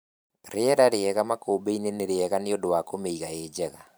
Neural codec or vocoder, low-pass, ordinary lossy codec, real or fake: vocoder, 44.1 kHz, 128 mel bands every 512 samples, BigVGAN v2; none; none; fake